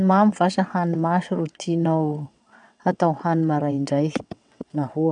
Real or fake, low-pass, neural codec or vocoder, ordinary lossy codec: fake; 9.9 kHz; vocoder, 22.05 kHz, 80 mel bands, WaveNeXt; none